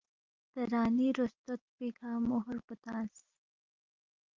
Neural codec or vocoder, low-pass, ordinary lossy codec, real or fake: none; 7.2 kHz; Opus, 24 kbps; real